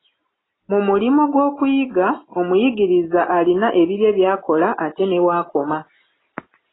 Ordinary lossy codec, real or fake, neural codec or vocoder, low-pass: AAC, 16 kbps; real; none; 7.2 kHz